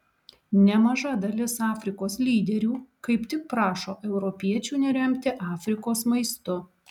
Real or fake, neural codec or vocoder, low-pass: real; none; 19.8 kHz